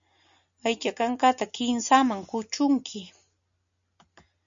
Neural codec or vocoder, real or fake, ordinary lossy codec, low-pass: none; real; MP3, 64 kbps; 7.2 kHz